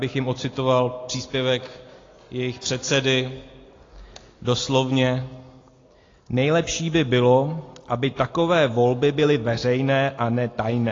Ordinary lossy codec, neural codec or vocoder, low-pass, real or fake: AAC, 32 kbps; none; 7.2 kHz; real